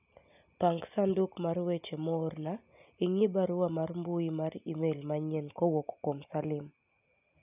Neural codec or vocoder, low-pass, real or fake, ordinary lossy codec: none; 3.6 kHz; real; none